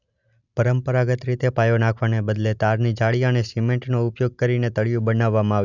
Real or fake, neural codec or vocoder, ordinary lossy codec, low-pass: real; none; none; 7.2 kHz